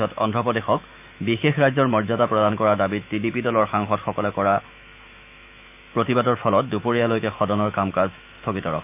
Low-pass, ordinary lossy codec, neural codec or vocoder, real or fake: 3.6 kHz; none; autoencoder, 48 kHz, 128 numbers a frame, DAC-VAE, trained on Japanese speech; fake